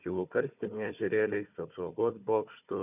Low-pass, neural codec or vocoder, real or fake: 3.6 kHz; codec, 16 kHz, 4 kbps, FunCodec, trained on Chinese and English, 50 frames a second; fake